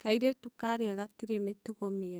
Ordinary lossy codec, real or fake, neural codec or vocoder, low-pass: none; fake; codec, 44.1 kHz, 2.6 kbps, SNAC; none